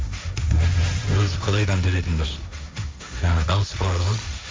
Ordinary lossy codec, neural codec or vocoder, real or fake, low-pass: none; codec, 16 kHz, 1.1 kbps, Voila-Tokenizer; fake; none